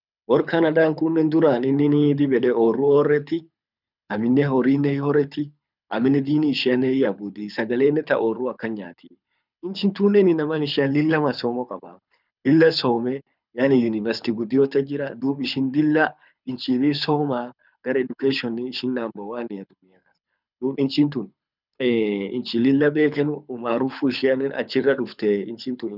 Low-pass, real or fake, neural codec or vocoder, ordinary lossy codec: 5.4 kHz; fake; codec, 24 kHz, 6 kbps, HILCodec; none